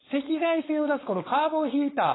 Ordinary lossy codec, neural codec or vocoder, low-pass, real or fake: AAC, 16 kbps; codec, 16 kHz, 4.8 kbps, FACodec; 7.2 kHz; fake